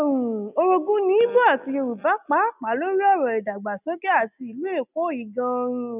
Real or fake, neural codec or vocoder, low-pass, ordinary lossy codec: real; none; 3.6 kHz; none